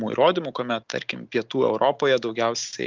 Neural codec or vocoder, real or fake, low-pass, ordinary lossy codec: none; real; 7.2 kHz; Opus, 24 kbps